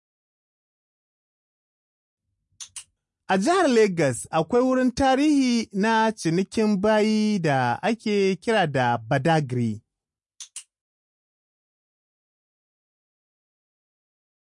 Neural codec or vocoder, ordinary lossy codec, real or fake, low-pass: none; MP3, 48 kbps; real; 10.8 kHz